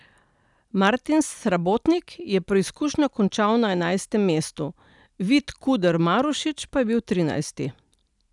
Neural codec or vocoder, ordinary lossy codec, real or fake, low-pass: none; none; real; 10.8 kHz